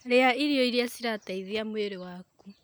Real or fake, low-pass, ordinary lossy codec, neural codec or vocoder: real; none; none; none